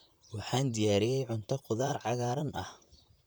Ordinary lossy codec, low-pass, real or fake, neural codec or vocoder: none; none; fake; vocoder, 44.1 kHz, 128 mel bands, Pupu-Vocoder